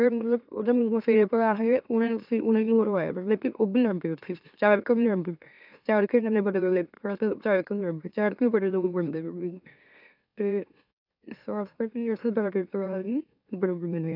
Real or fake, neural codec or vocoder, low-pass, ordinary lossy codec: fake; autoencoder, 44.1 kHz, a latent of 192 numbers a frame, MeloTTS; 5.4 kHz; none